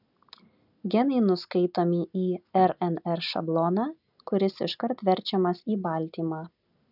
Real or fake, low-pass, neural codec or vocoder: real; 5.4 kHz; none